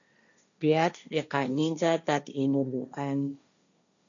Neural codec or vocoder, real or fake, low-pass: codec, 16 kHz, 1.1 kbps, Voila-Tokenizer; fake; 7.2 kHz